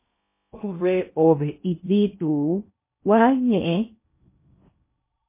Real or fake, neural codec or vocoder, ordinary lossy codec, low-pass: fake; codec, 16 kHz in and 24 kHz out, 0.6 kbps, FocalCodec, streaming, 4096 codes; MP3, 24 kbps; 3.6 kHz